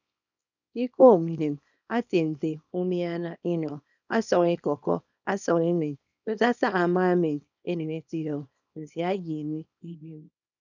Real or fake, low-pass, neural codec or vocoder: fake; 7.2 kHz; codec, 24 kHz, 0.9 kbps, WavTokenizer, small release